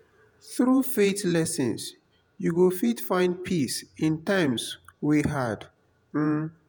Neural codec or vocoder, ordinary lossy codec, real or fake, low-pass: vocoder, 48 kHz, 128 mel bands, Vocos; none; fake; none